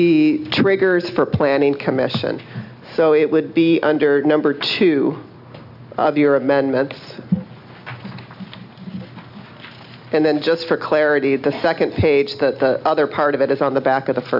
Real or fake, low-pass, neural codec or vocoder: real; 5.4 kHz; none